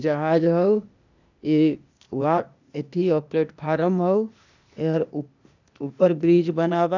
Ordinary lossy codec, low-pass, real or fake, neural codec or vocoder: none; 7.2 kHz; fake; codec, 16 kHz, 0.8 kbps, ZipCodec